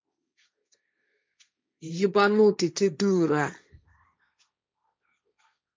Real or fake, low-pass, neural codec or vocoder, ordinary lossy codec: fake; none; codec, 16 kHz, 1.1 kbps, Voila-Tokenizer; none